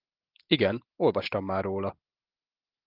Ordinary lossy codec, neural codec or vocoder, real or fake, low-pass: Opus, 32 kbps; none; real; 5.4 kHz